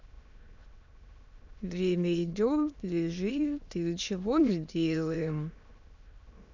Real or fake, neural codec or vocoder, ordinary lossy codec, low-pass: fake; autoencoder, 22.05 kHz, a latent of 192 numbers a frame, VITS, trained on many speakers; none; 7.2 kHz